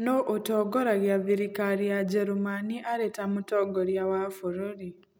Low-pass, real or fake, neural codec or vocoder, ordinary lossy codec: none; real; none; none